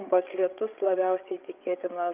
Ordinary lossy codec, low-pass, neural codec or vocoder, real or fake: Opus, 24 kbps; 3.6 kHz; codec, 16 kHz, 8 kbps, FreqCodec, larger model; fake